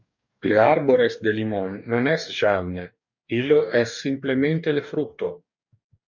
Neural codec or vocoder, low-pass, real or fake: codec, 44.1 kHz, 2.6 kbps, DAC; 7.2 kHz; fake